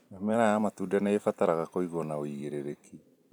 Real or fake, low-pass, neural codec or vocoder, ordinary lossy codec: fake; 19.8 kHz; vocoder, 44.1 kHz, 128 mel bands every 256 samples, BigVGAN v2; none